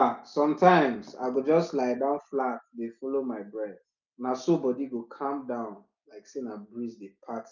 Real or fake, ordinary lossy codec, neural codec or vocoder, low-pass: real; none; none; 7.2 kHz